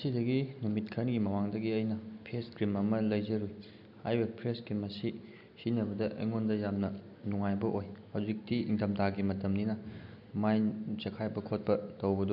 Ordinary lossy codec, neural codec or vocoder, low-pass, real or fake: none; none; 5.4 kHz; real